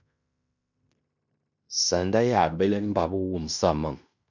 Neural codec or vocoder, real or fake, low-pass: codec, 16 kHz in and 24 kHz out, 0.9 kbps, LongCat-Audio-Codec, fine tuned four codebook decoder; fake; 7.2 kHz